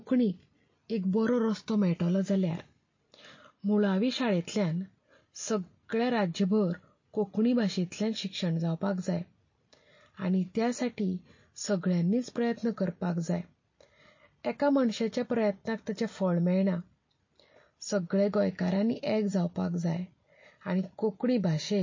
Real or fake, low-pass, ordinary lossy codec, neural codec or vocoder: real; 7.2 kHz; MP3, 32 kbps; none